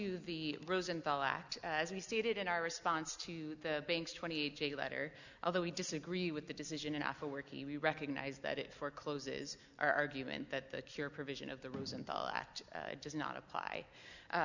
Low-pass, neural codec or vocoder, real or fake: 7.2 kHz; none; real